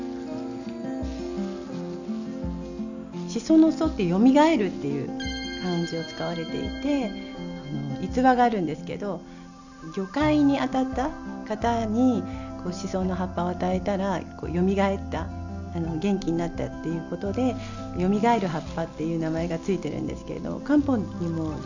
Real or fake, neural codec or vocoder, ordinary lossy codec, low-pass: real; none; none; 7.2 kHz